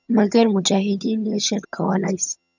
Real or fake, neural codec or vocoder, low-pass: fake; vocoder, 22.05 kHz, 80 mel bands, HiFi-GAN; 7.2 kHz